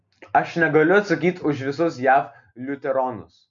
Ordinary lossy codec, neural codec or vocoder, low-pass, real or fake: MP3, 64 kbps; none; 7.2 kHz; real